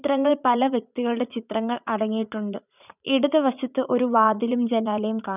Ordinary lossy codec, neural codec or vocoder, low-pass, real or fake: none; vocoder, 44.1 kHz, 80 mel bands, Vocos; 3.6 kHz; fake